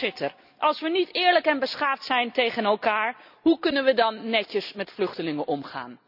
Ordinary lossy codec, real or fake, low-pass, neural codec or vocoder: none; real; 5.4 kHz; none